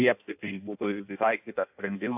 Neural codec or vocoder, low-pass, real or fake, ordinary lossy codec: codec, 16 kHz in and 24 kHz out, 0.6 kbps, FireRedTTS-2 codec; 3.6 kHz; fake; AAC, 32 kbps